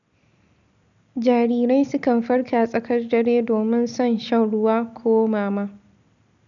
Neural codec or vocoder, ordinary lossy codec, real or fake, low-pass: none; none; real; 7.2 kHz